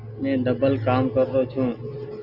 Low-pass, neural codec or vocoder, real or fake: 5.4 kHz; none; real